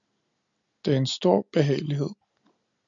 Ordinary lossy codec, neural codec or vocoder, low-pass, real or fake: MP3, 96 kbps; none; 7.2 kHz; real